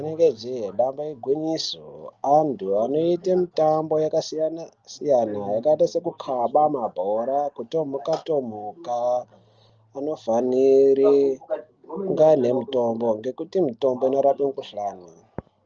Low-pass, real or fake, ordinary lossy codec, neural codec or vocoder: 7.2 kHz; real; Opus, 24 kbps; none